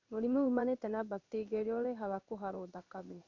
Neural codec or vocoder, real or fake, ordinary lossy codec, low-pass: codec, 16 kHz in and 24 kHz out, 1 kbps, XY-Tokenizer; fake; MP3, 64 kbps; 7.2 kHz